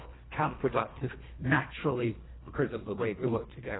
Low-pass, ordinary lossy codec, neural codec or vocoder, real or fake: 7.2 kHz; AAC, 16 kbps; codec, 24 kHz, 1.5 kbps, HILCodec; fake